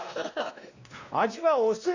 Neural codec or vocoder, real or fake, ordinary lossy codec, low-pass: codec, 16 kHz, 1 kbps, X-Codec, WavLM features, trained on Multilingual LibriSpeech; fake; Opus, 64 kbps; 7.2 kHz